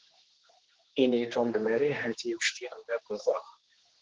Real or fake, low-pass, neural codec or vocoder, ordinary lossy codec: fake; 7.2 kHz; codec, 16 kHz, 2 kbps, X-Codec, HuBERT features, trained on general audio; Opus, 16 kbps